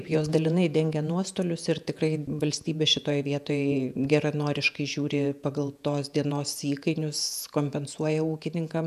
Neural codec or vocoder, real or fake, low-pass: vocoder, 48 kHz, 128 mel bands, Vocos; fake; 14.4 kHz